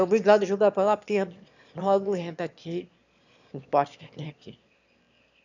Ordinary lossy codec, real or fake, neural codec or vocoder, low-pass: none; fake; autoencoder, 22.05 kHz, a latent of 192 numbers a frame, VITS, trained on one speaker; 7.2 kHz